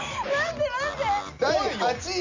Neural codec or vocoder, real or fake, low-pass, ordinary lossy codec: none; real; 7.2 kHz; MP3, 64 kbps